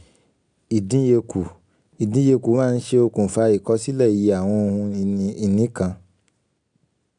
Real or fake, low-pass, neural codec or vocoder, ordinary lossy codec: real; 9.9 kHz; none; none